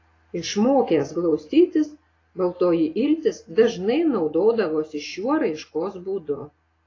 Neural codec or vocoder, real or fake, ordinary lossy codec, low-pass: none; real; AAC, 32 kbps; 7.2 kHz